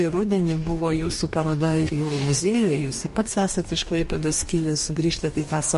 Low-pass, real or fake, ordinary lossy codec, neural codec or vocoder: 14.4 kHz; fake; MP3, 48 kbps; codec, 44.1 kHz, 2.6 kbps, DAC